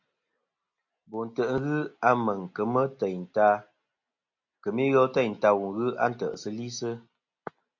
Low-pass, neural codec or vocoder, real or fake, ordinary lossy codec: 7.2 kHz; none; real; AAC, 48 kbps